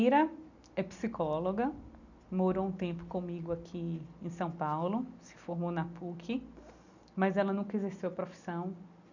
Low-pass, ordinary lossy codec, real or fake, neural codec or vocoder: 7.2 kHz; none; real; none